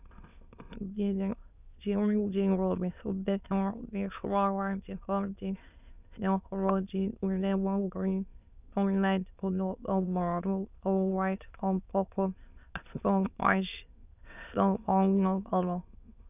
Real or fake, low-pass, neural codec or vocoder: fake; 3.6 kHz; autoencoder, 22.05 kHz, a latent of 192 numbers a frame, VITS, trained on many speakers